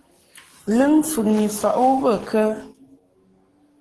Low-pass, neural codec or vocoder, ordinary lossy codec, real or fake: 10.8 kHz; codec, 44.1 kHz, 7.8 kbps, DAC; Opus, 16 kbps; fake